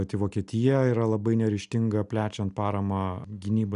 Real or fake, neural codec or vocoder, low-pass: real; none; 10.8 kHz